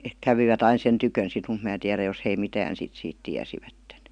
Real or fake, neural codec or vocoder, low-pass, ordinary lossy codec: real; none; 9.9 kHz; none